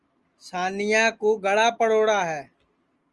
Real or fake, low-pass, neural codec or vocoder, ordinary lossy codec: real; 10.8 kHz; none; Opus, 32 kbps